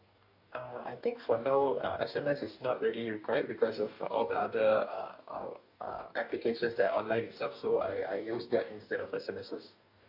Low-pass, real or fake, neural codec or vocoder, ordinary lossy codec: 5.4 kHz; fake; codec, 44.1 kHz, 2.6 kbps, DAC; none